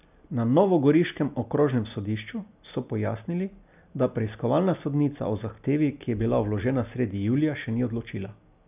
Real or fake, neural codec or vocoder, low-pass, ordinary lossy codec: real; none; 3.6 kHz; none